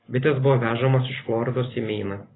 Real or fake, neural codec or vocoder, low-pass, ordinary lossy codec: fake; vocoder, 44.1 kHz, 128 mel bands every 512 samples, BigVGAN v2; 7.2 kHz; AAC, 16 kbps